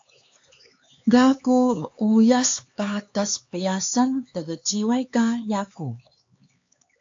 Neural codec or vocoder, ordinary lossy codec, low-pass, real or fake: codec, 16 kHz, 4 kbps, X-Codec, HuBERT features, trained on LibriSpeech; AAC, 48 kbps; 7.2 kHz; fake